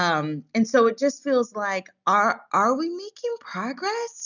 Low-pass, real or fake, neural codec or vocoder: 7.2 kHz; real; none